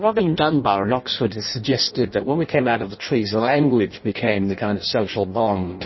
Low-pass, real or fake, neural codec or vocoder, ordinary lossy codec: 7.2 kHz; fake; codec, 16 kHz in and 24 kHz out, 0.6 kbps, FireRedTTS-2 codec; MP3, 24 kbps